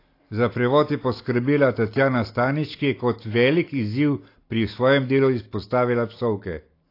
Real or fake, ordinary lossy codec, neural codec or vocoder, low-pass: real; AAC, 32 kbps; none; 5.4 kHz